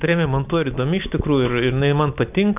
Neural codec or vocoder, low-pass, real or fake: none; 3.6 kHz; real